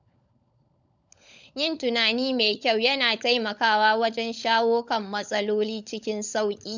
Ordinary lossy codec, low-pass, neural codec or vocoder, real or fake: none; 7.2 kHz; codec, 16 kHz, 16 kbps, FunCodec, trained on LibriTTS, 50 frames a second; fake